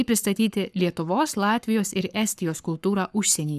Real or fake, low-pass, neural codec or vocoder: fake; 14.4 kHz; codec, 44.1 kHz, 7.8 kbps, Pupu-Codec